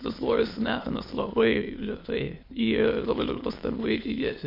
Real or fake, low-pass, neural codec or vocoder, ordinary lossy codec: fake; 5.4 kHz; autoencoder, 22.05 kHz, a latent of 192 numbers a frame, VITS, trained on many speakers; MP3, 32 kbps